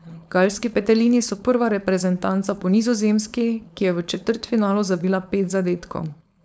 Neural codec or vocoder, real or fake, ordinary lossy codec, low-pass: codec, 16 kHz, 4.8 kbps, FACodec; fake; none; none